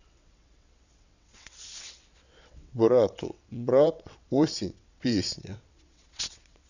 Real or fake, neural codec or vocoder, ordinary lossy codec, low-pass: fake; vocoder, 22.05 kHz, 80 mel bands, WaveNeXt; none; 7.2 kHz